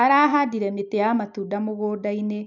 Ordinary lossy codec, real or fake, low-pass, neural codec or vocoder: none; real; 7.2 kHz; none